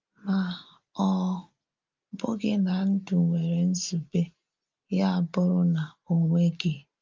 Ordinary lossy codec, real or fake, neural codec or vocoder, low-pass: Opus, 24 kbps; real; none; 7.2 kHz